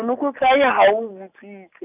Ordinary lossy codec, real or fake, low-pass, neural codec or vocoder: none; real; 3.6 kHz; none